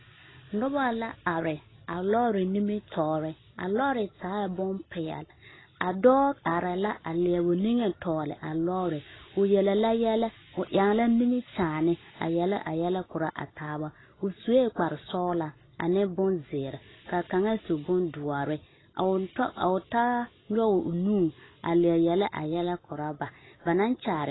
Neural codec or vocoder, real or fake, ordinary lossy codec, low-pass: none; real; AAC, 16 kbps; 7.2 kHz